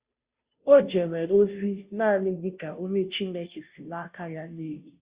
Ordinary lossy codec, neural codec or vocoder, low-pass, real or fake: Opus, 64 kbps; codec, 16 kHz, 0.5 kbps, FunCodec, trained on Chinese and English, 25 frames a second; 3.6 kHz; fake